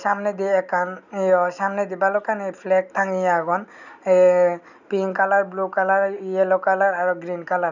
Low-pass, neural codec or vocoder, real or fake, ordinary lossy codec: 7.2 kHz; none; real; none